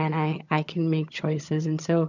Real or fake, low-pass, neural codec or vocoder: fake; 7.2 kHz; vocoder, 22.05 kHz, 80 mel bands, HiFi-GAN